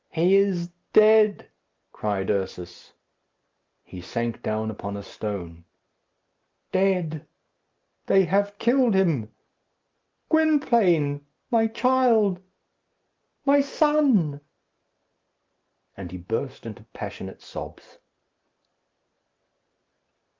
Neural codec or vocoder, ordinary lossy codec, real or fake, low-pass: none; Opus, 32 kbps; real; 7.2 kHz